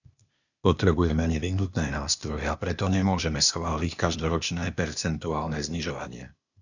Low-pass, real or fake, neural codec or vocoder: 7.2 kHz; fake; codec, 16 kHz, 0.8 kbps, ZipCodec